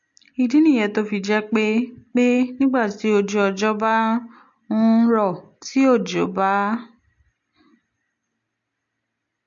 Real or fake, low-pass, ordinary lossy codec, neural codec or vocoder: real; 7.2 kHz; MP3, 48 kbps; none